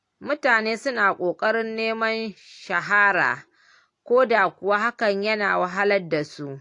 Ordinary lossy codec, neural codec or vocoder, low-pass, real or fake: AAC, 48 kbps; none; 10.8 kHz; real